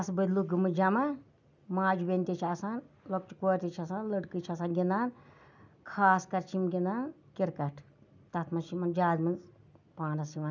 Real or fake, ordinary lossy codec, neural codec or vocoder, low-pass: real; none; none; 7.2 kHz